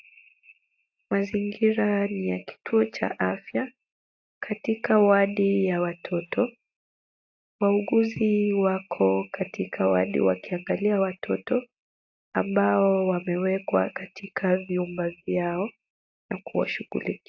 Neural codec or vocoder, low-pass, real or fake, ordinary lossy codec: none; 7.2 kHz; real; AAC, 48 kbps